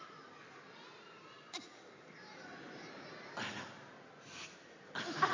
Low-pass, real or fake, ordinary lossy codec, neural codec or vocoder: 7.2 kHz; real; MP3, 32 kbps; none